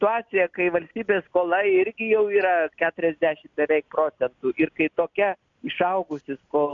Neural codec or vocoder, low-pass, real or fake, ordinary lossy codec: none; 7.2 kHz; real; AAC, 64 kbps